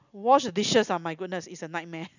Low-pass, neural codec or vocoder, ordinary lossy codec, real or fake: 7.2 kHz; none; none; real